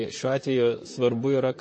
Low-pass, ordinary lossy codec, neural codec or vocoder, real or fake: 9.9 kHz; MP3, 32 kbps; vocoder, 44.1 kHz, 128 mel bands, Pupu-Vocoder; fake